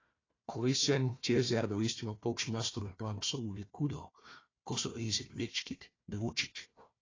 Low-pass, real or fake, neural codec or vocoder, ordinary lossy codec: 7.2 kHz; fake; codec, 16 kHz, 1 kbps, FunCodec, trained on Chinese and English, 50 frames a second; AAC, 32 kbps